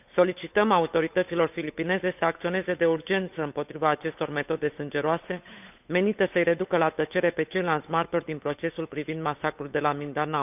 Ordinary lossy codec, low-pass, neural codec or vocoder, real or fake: none; 3.6 kHz; codec, 16 kHz, 8 kbps, FunCodec, trained on Chinese and English, 25 frames a second; fake